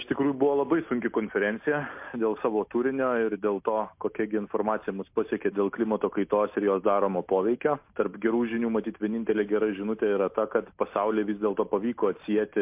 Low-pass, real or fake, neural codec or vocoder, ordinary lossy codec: 3.6 kHz; real; none; MP3, 32 kbps